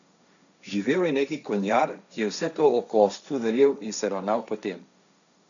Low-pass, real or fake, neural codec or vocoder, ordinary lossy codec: 7.2 kHz; fake; codec, 16 kHz, 1.1 kbps, Voila-Tokenizer; none